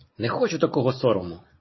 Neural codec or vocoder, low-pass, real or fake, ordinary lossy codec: none; 7.2 kHz; real; MP3, 24 kbps